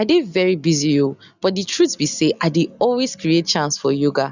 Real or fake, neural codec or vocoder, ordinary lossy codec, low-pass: real; none; none; 7.2 kHz